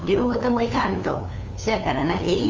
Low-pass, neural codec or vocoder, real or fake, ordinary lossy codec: 7.2 kHz; codec, 16 kHz, 2 kbps, FunCodec, trained on LibriTTS, 25 frames a second; fake; Opus, 32 kbps